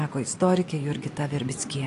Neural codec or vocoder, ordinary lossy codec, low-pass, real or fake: none; AAC, 48 kbps; 10.8 kHz; real